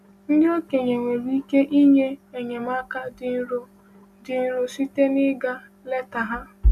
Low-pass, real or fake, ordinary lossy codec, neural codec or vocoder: 14.4 kHz; real; none; none